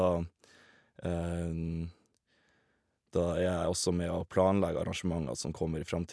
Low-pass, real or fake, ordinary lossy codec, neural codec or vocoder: none; real; none; none